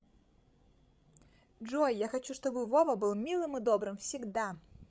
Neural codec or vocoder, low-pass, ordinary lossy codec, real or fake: codec, 16 kHz, 16 kbps, FreqCodec, larger model; none; none; fake